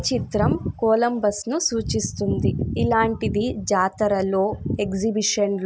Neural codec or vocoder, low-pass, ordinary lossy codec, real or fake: none; none; none; real